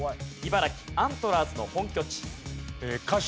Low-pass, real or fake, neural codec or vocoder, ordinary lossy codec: none; real; none; none